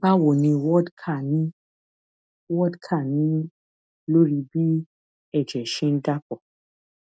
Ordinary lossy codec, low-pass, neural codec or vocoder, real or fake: none; none; none; real